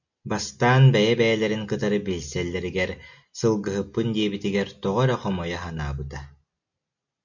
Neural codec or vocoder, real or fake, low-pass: none; real; 7.2 kHz